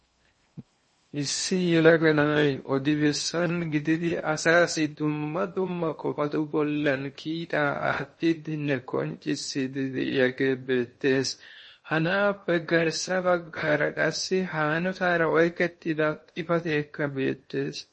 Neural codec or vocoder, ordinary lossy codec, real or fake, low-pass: codec, 16 kHz in and 24 kHz out, 0.6 kbps, FocalCodec, streaming, 2048 codes; MP3, 32 kbps; fake; 10.8 kHz